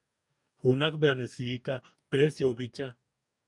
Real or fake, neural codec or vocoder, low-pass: fake; codec, 44.1 kHz, 2.6 kbps, DAC; 10.8 kHz